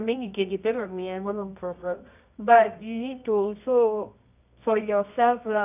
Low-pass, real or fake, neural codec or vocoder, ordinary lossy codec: 3.6 kHz; fake; codec, 24 kHz, 0.9 kbps, WavTokenizer, medium music audio release; none